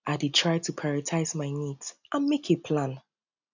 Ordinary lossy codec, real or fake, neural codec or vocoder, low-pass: none; real; none; 7.2 kHz